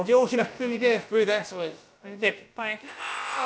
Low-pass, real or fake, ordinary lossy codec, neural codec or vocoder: none; fake; none; codec, 16 kHz, about 1 kbps, DyCAST, with the encoder's durations